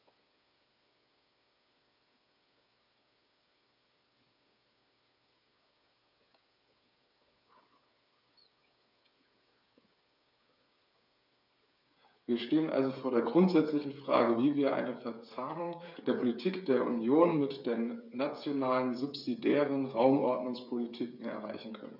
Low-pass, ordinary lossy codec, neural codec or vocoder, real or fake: 5.4 kHz; none; codec, 16 kHz, 8 kbps, FreqCodec, smaller model; fake